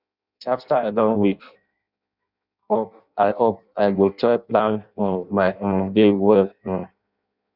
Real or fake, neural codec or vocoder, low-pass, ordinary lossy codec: fake; codec, 16 kHz in and 24 kHz out, 0.6 kbps, FireRedTTS-2 codec; 5.4 kHz; none